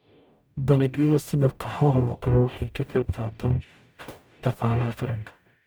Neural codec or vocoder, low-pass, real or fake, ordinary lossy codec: codec, 44.1 kHz, 0.9 kbps, DAC; none; fake; none